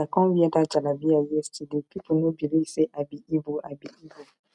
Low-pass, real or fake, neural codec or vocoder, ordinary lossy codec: 10.8 kHz; real; none; none